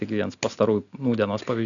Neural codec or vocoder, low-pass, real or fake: none; 7.2 kHz; real